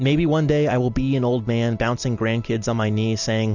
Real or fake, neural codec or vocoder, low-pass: real; none; 7.2 kHz